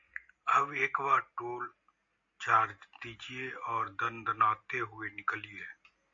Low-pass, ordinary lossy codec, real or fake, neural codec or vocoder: 7.2 kHz; AAC, 64 kbps; real; none